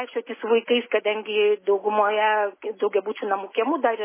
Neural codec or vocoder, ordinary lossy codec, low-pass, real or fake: none; MP3, 16 kbps; 3.6 kHz; real